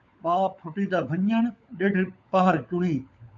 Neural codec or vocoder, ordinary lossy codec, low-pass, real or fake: codec, 16 kHz, 16 kbps, FunCodec, trained on LibriTTS, 50 frames a second; AAC, 64 kbps; 7.2 kHz; fake